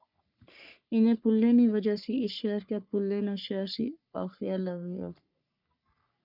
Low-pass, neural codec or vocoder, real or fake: 5.4 kHz; codec, 44.1 kHz, 3.4 kbps, Pupu-Codec; fake